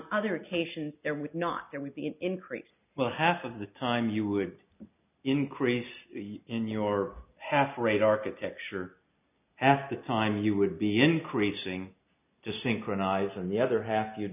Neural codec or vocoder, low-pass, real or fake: none; 3.6 kHz; real